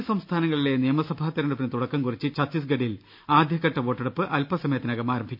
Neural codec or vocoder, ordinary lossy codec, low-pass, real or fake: none; none; 5.4 kHz; real